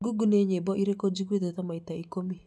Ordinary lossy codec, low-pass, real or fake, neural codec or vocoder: none; none; real; none